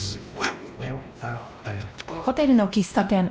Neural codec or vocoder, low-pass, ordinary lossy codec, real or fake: codec, 16 kHz, 1 kbps, X-Codec, WavLM features, trained on Multilingual LibriSpeech; none; none; fake